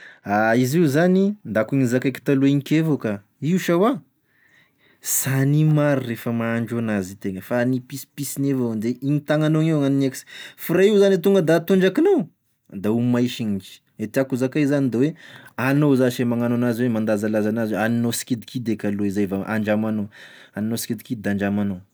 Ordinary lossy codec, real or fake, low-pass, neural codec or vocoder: none; real; none; none